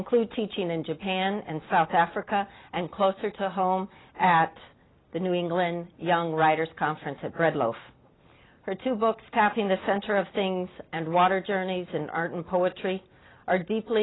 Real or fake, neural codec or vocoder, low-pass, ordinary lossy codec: real; none; 7.2 kHz; AAC, 16 kbps